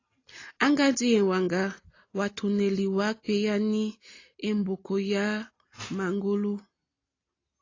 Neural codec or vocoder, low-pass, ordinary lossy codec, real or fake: none; 7.2 kHz; AAC, 32 kbps; real